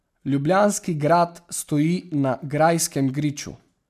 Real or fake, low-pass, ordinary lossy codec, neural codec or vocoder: real; 14.4 kHz; MP3, 96 kbps; none